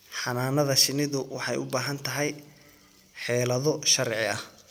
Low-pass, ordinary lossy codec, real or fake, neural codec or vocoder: none; none; real; none